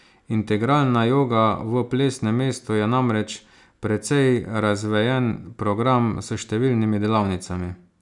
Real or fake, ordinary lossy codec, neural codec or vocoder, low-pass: real; none; none; 10.8 kHz